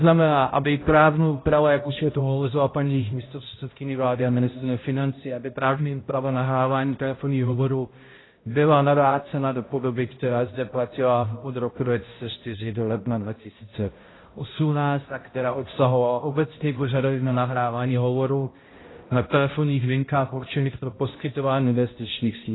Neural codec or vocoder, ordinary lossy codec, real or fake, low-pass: codec, 16 kHz, 0.5 kbps, X-Codec, HuBERT features, trained on balanced general audio; AAC, 16 kbps; fake; 7.2 kHz